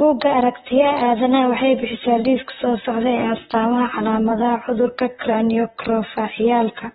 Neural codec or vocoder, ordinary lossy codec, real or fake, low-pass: vocoder, 44.1 kHz, 128 mel bands, Pupu-Vocoder; AAC, 16 kbps; fake; 19.8 kHz